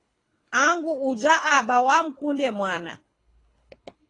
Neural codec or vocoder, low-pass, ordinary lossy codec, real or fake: codec, 24 kHz, 3 kbps, HILCodec; 10.8 kHz; AAC, 32 kbps; fake